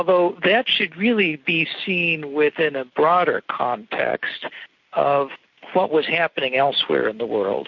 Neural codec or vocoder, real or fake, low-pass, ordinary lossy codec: none; real; 7.2 kHz; MP3, 48 kbps